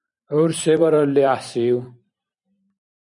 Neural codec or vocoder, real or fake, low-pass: vocoder, 44.1 kHz, 128 mel bands every 512 samples, BigVGAN v2; fake; 10.8 kHz